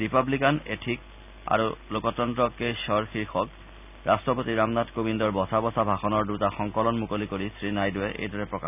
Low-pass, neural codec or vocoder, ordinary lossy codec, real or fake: 3.6 kHz; none; none; real